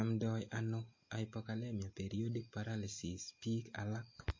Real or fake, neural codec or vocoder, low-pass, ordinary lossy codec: real; none; 7.2 kHz; MP3, 32 kbps